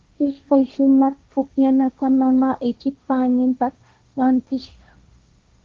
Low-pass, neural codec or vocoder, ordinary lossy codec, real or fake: 7.2 kHz; codec, 16 kHz, 1.1 kbps, Voila-Tokenizer; Opus, 32 kbps; fake